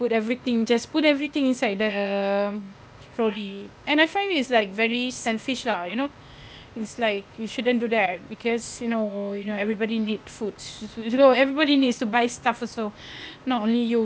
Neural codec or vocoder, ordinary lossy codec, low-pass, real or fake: codec, 16 kHz, 0.8 kbps, ZipCodec; none; none; fake